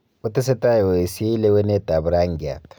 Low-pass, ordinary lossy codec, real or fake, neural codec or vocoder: none; none; real; none